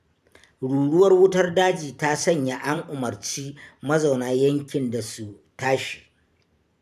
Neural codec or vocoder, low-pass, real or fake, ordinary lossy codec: vocoder, 44.1 kHz, 128 mel bands every 512 samples, BigVGAN v2; 14.4 kHz; fake; none